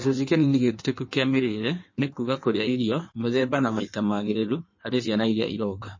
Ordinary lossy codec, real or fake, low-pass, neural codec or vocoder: MP3, 32 kbps; fake; 7.2 kHz; codec, 16 kHz in and 24 kHz out, 1.1 kbps, FireRedTTS-2 codec